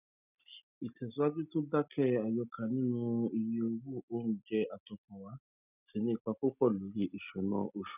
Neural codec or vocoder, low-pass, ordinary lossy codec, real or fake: none; 3.6 kHz; none; real